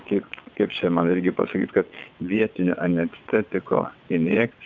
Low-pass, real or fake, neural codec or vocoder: 7.2 kHz; fake; vocoder, 22.05 kHz, 80 mel bands, WaveNeXt